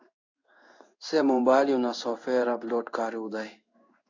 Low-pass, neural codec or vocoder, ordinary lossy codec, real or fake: 7.2 kHz; codec, 16 kHz in and 24 kHz out, 1 kbps, XY-Tokenizer; AAC, 48 kbps; fake